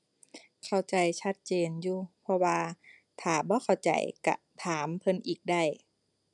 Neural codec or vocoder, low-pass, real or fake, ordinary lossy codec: none; 10.8 kHz; real; none